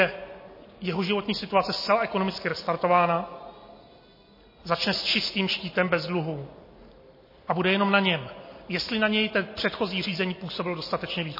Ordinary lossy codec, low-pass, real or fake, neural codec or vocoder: MP3, 24 kbps; 5.4 kHz; real; none